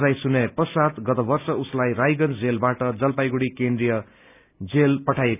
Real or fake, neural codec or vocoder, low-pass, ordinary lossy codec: real; none; 3.6 kHz; none